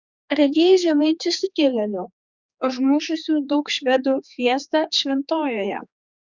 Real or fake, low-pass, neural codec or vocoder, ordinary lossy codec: fake; 7.2 kHz; codec, 16 kHz, 4 kbps, X-Codec, HuBERT features, trained on general audio; Opus, 64 kbps